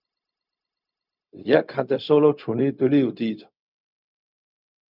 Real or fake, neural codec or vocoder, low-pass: fake; codec, 16 kHz, 0.4 kbps, LongCat-Audio-Codec; 5.4 kHz